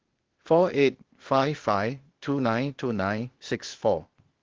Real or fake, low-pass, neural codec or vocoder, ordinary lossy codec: fake; 7.2 kHz; codec, 16 kHz, 0.8 kbps, ZipCodec; Opus, 16 kbps